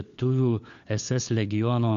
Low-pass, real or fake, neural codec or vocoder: 7.2 kHz; fake; codec, 16 kHz, 4 kbps, FunCodec, trained on LibriTTS, 50 frames a second